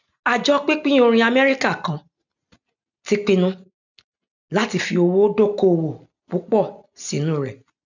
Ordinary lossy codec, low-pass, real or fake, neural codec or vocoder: none; 7.2 kHz; real; none